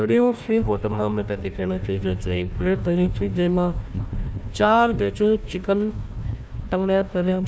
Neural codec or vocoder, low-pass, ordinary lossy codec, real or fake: codec, 16 kHz, 1 kbps, FunCodec, trained on Chinese and English, 50 frames a second; none; none; fake